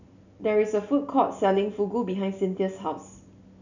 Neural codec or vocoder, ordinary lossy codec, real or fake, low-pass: none; none; real; 7.2 kHz